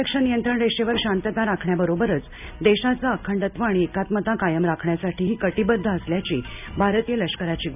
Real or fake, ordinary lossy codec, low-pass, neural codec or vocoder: real; none; 3.6 kHz; none